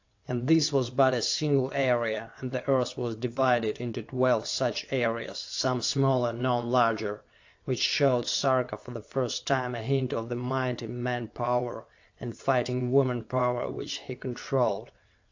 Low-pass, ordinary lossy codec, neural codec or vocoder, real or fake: 7.2 kHz; AAC, 48 kbps; vocoder, 22.05 kHz, 80 mel bands, WaveNeXt; fake